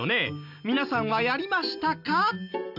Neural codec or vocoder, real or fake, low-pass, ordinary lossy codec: none; real; 5.4 kHz; none